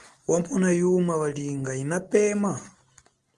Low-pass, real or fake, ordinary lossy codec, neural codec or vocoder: 10.8 kHz; real; Opus, 24 kbps; none